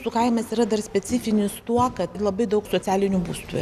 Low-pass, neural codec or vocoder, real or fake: 14.4 kHz; none; real